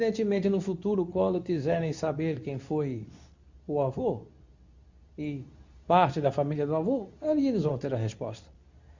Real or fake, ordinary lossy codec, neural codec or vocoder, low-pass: fake; Opus, 64 kbps; codec, 24 kHz, 0.9 kbps, WavTokenizer, medium speech release version 2; 7.2 kHz